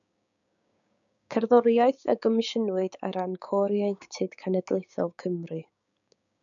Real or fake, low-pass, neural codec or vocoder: fake; 7.2 kHz; codec, 16 kHz, 6 kbps, DAC